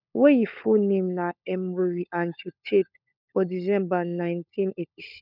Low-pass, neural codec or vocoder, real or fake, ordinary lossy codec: 5.4 kHz; codec, 16 kHz, 16 kbps, FunCodec, trained on LibriTTS, 50 frames a second; fake; none